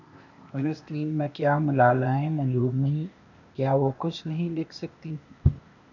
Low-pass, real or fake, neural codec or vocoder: 7.2 kHz; fake; codec, 16 kHz, 0.8 kbps, ZipCodec